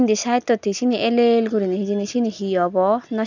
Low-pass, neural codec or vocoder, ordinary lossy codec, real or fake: 7.2 kHz; none; none; real